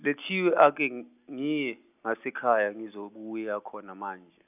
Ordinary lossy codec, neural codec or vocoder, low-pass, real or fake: none; none; 3.6 kHz; real